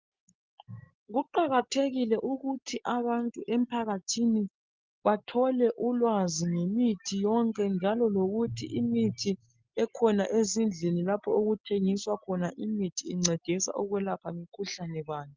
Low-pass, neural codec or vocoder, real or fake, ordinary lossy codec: 7.2 kHz; none; real; Opus, 24 kbps